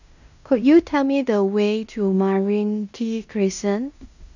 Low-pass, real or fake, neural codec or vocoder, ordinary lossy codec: 7.2 kHz; fake; codec, 16 kHz in and 24 kHz out, 0.9 kbps, LongCat-Audio-Codec, fine tuned four codebook decoder; none